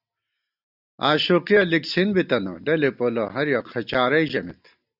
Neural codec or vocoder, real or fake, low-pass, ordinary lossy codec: none; real; 5.4 kHz; Opus, 64 kbps